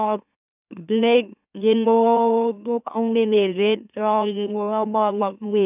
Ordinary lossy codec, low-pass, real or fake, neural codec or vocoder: none; 3.6 kHz; fake; autoencoder, 44.1 kHz, a latent of 192 numbers a frame, MeloTTS